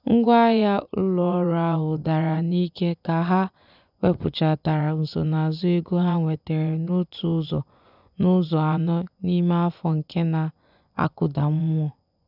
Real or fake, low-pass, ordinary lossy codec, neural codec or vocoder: fake; 5.4 kHz; none; vocoder, 24 kHz, 100 mel bands, Vocos